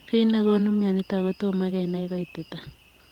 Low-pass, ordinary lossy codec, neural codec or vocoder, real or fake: 19.8 kHz; Opus, 24 kbps; vocoder, 48 kHz, 128 mel bands, Vocos; fake